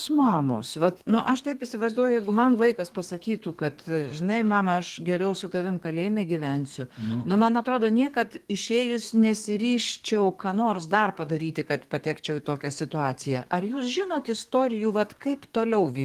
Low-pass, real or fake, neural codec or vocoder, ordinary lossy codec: 14.4 kHz; fake; codec, 32 kHz, 1.9 kbps, SNAC; Opus, 24 kbps